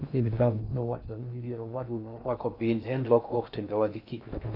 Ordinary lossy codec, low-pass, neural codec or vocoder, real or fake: none; 5.4 kHz; codec, 16 kHz in and 24 kHz out, 0.6 kbps, FocalCodec, streaming, 2048 codes; fake